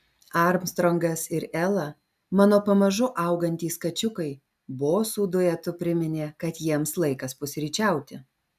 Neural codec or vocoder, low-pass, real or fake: none; 14.4 kHz; real